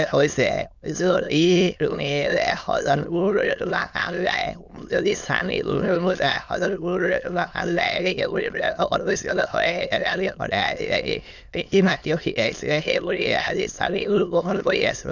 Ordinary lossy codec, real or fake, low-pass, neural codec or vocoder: none; fake; 7.2 kHz; autoencoder, 22.05 kHz, a latent of 192 numbers a frame, VITS, trained on many speakers